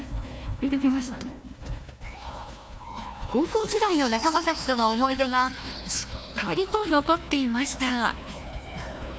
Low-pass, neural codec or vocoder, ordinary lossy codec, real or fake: none; codec, 16 kHz, 1 kbps, FunCodec, trained on Chinese and English, 50 frames a second; none; fake